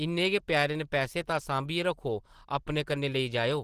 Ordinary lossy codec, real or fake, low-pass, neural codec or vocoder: Opus, 16 kbps; real; 14.4 kHz; none